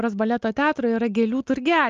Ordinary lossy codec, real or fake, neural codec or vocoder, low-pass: Opus, 32 kbps; real; none; 7.2 kHz